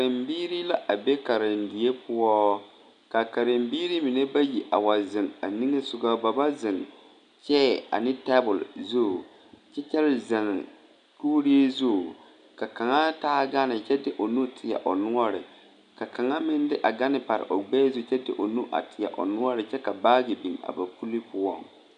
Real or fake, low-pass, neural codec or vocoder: real; 9.9 kHz; none